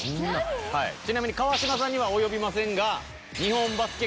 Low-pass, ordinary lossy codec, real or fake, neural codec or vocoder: none; none; real; none